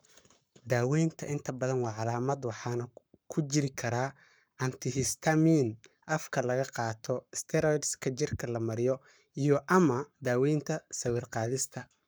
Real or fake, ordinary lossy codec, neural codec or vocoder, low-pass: fake; none; codec, 44.1 kHz, 7.8 kbps, Pupu-Codec; none